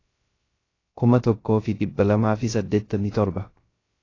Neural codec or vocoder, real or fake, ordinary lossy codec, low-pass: codec, 16 kHz, 0.3 kbps, FocalCodec; fake; AAC, 32 kbps; 7.2 kHz